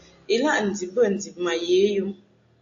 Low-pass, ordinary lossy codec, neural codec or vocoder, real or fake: 7.2 kHz; AAC, 48 kbps; none; real